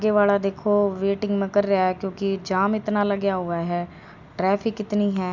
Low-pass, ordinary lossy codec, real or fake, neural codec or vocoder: 7.2 kHz; none; real; none